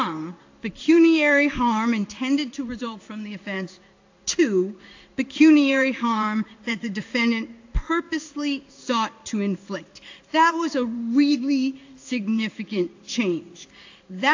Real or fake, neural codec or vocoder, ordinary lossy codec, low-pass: fake; codec, 16 kHz in and 24 kHz out, 1 kbps, XY-Tokenizer; AAC, 48 kbps; 7.2 kHz